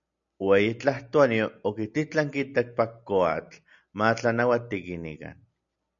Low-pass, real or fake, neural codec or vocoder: 7.2 kHz; real; none